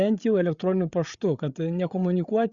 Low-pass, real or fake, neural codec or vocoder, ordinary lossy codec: 7.2 kHz; fake; codec, 16 kHz, 8 kbps, FreqCodec, larger model; Opus, 64 kbps